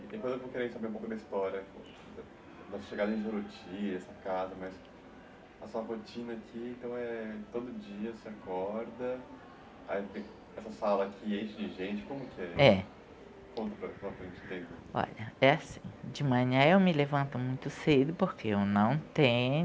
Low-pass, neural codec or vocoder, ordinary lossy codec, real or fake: none; none; none; real